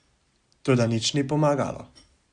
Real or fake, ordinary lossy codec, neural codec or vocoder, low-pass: real; Opus, 64 kbps; none; 9.9 kHz